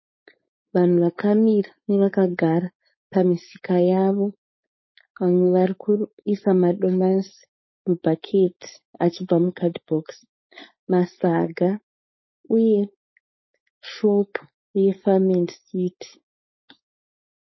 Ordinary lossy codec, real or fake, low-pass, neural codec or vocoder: MP3, 24 kbps; fake; 7.2 kHz; codec, 16 kHz, 4.8 kbps, FACodec